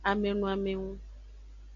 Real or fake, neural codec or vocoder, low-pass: real; none; 7.2 kHz